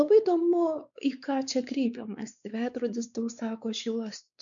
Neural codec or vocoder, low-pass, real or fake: codec, 16 kHz, 4 kbps, X-Codec, WavLM features, trained on Multilingual LibriSpeech; 7.2 kHz; fake